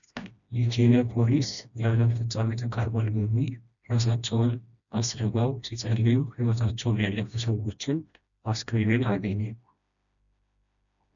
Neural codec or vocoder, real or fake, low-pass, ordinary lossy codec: codec, 16 kHz, 1 kbps, FreqCodec, smaller model; fake; 7.2 kHz; MP3, 96 kbps